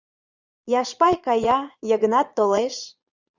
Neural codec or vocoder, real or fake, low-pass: vocoder, 22.05 kHz, 80 mel bands, Vocos; fake; 7.2 kHz